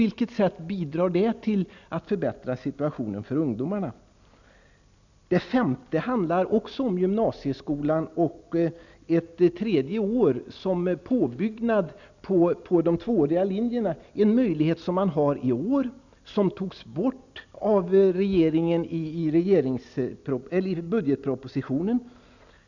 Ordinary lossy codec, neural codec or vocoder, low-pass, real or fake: none; none; 7.2 kHz; real